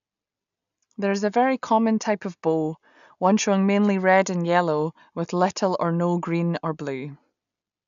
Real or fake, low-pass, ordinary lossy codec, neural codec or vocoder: real; 7.2 kHz; none; none